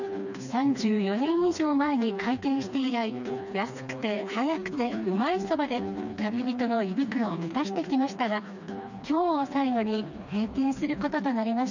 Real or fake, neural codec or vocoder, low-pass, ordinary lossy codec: fake; codec, 16 kHz, 2 kbps, FreqCodec, smaller model; 7.2 kHz; none